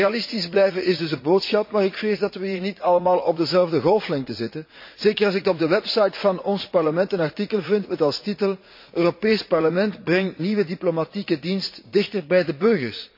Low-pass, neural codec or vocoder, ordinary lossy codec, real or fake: 5.4 kHz; vocoder, 44.1 kHz, 80 mel bands, Vocos; MP3, 32 kbps; fake